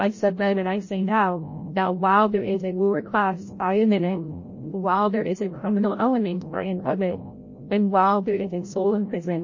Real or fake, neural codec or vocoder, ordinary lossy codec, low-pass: fake; codec, 16 kHz, 0.5 kbps, FreqCodec, larger model; MP3, 32 kbps; 7.2 kHz